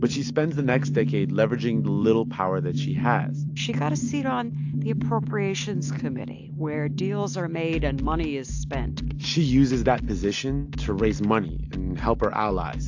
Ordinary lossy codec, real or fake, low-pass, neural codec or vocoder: AAC, 48 kbps; real; 7.2 kHz; none